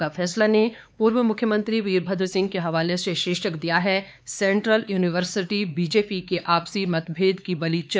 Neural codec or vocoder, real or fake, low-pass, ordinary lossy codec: codec, 16 kHz, 4 kbps, X-Codec, HuBERT features, trained on LibriSpeech; fake; none; none